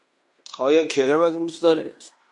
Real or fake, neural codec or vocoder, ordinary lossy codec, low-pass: fake; codec, 16 kHz in and 24 kHz out, 0.9 kbps, LongCat-Audio-Codec, fine tuned four codebook decoder; AAC, 64 kbps; 10.8 kHz